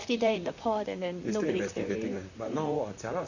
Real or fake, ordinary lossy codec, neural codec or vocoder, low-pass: fake; none; vocoder, 44.1 kHz, 128 mel bands, Pupu-Vocoder; 7.2 kHz